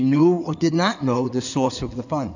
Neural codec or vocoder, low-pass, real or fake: codec, 16 kHz in and 24 kHz out, 2.2 kbps, FireRedTTS-2 codec; 7.2 kHz; fake